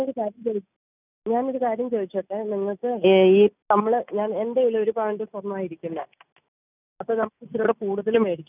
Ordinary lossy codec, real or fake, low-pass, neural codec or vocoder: none; real; 3.6 kHz; none